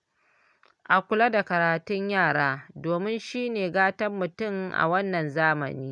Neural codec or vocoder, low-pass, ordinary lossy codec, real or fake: none; none; none; real